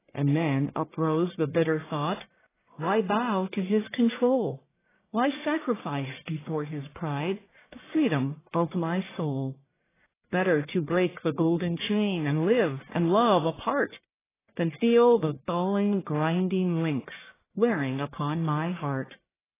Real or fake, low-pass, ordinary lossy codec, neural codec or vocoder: fake; 3.6 kHz; AAC, 16 kbps; codec, 44.1 kHz, 1.7 kbps, Pupu-Codec